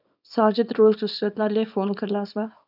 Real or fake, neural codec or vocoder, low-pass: fake; codec, 24 kHz, 0.9 kbps, WavTokenizer, small release; 5.4 kHz